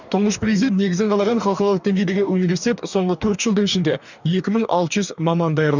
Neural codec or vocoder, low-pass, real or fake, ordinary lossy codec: codec, 44.1 kHz, 2.6 kbps, DAC; 7.2 kHz; fake; none